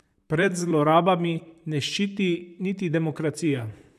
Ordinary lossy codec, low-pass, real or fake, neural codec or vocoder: none; 14.4 kHz; fake; vocoder, 44.1 kHz, 128 mel bands, Pupu-Vocoder